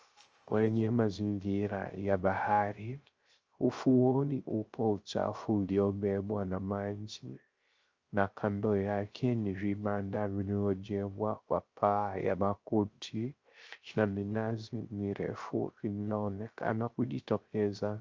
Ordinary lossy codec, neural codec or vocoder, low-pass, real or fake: Opus, 24 kbps; codec, 16 kHz, 0.3 kbps, FocalCodec; 7.2 kHz; fake